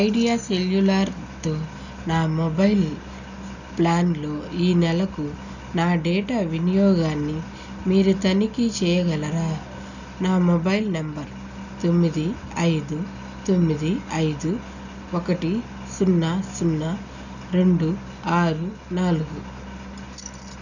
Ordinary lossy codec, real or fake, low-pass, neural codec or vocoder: none; real; 7.2 kHz; none